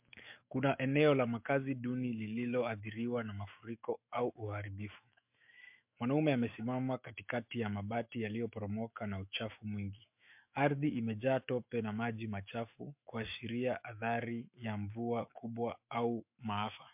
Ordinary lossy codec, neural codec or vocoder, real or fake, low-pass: MP3, 32 kbps; autoencoder, 48 kHz, 128 numbers a frame, DAC-VAE, trained on Japanese speech; fake; 3.6 kHz